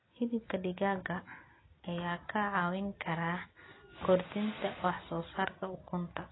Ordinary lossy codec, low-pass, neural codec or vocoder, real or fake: AAC, 16 kbps; 7.2 kHz; none; real